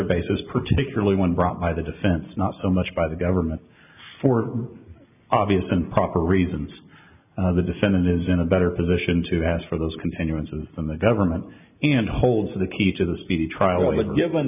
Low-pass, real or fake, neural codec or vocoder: 3.6 kHz; real; none